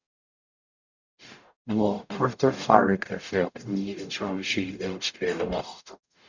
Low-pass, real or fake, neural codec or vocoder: 7.2 kHz; fake; codec, 44.1 kHz, 0.9 kbps, DAC